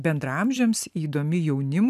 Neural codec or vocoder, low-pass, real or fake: none; 14.4 kHz; real